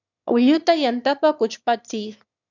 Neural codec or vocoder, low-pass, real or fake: autoencoder, 22.05 kHz, a latent of 192 numbers a frame, VITS, trained on one speaker; 7.2 kHz; fake